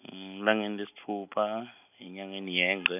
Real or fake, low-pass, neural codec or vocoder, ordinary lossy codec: real; 3.6 kHz; none; none